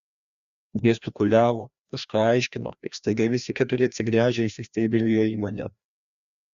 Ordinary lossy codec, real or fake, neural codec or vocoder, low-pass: Opus, 64 kbps; fake; codec, 16 kHz, 1 kbps, FreqCodec, larger model; 7.2 kHz